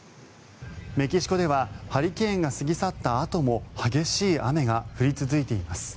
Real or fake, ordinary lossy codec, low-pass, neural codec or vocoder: real; none; none; none